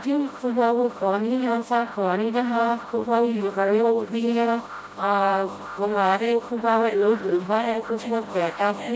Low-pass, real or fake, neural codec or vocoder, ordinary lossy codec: none; fake; codec, 16 kHz, 0.5 kbps, FreqCodec, smaller model; none